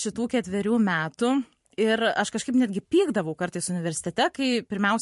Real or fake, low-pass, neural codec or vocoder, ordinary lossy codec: fake; 14.4 kHz; vocoder, 44.1 kHz, 128 mel bands every 512 samples, BigVGAN v2; MP3, 48 kbps